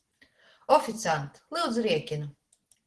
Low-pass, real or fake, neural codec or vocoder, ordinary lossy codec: 10.8 kHz; real; none; Opus, 16 kbps